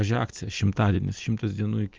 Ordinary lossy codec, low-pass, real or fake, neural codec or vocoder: Opus, 24 kbps; 7.2 kHz; real; none